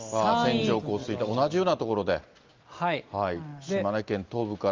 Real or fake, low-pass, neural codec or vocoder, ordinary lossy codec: real; 7.2 kHz; none; Opus, 32 kbps